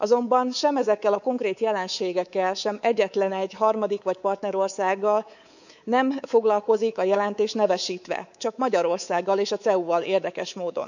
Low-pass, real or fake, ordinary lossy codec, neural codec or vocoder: 7.2 kHz; fake; none; codec, 24 kHz, 3.1 kbps, DualCodec